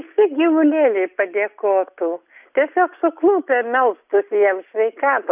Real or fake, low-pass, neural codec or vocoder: real; 3.6 kHz; none